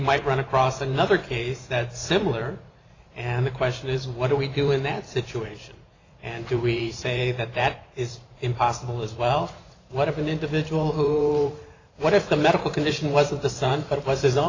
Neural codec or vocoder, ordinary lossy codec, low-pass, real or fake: vocoder, 44.1 kHz, 128 mel bands every 512 samples, BigVGAN v2; AAC, 32 kbps; 7.2 kHz; fake